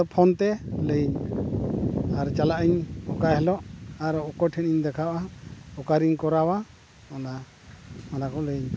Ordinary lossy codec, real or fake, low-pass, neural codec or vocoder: none; real; none; none